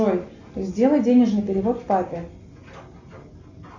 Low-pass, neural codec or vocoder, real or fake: 7.2 kHz; none; real